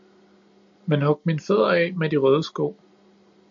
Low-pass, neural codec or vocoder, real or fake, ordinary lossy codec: 7.2 kHz; none; real; MP3, 48 kbps